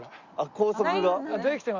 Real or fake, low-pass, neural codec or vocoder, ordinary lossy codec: real; 7.2 kHz; none; Opus, 64 kbps